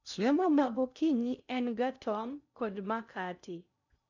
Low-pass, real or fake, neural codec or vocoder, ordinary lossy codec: 7.2 kHz; fake; codec, 16 kHz in and 24 kHz out, 0.6 kbps, FocalCodec, streaming, 2048 codes; none